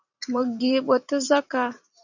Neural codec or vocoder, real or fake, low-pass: vocoder, 44.1 kHz, 128 mel bands every 256 samples, BigVGAN v2; fake; 7.2 kHz